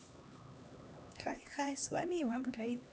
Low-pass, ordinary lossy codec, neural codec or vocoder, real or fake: none; none; codec, 16 kHz, 2 kbps, X-Codec, HuBERT features, trained on LibriSpeech; fake